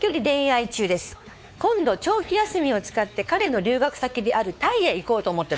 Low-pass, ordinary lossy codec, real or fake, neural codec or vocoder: none; none; fake; codec, 16 kHz, 4 kbps, X-Codec, WavLM features, trained on Multilingual LibriSpeech